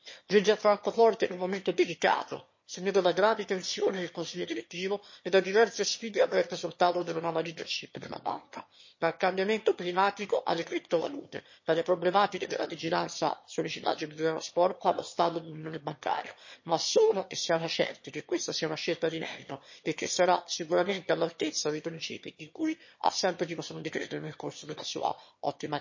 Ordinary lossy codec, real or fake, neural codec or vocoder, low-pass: MP3, 32 kbps; fake; autoencoder, 22.05 kHz, a latent of 192 numbers a frame, VITS, trained on one speaker; 7.2 kHz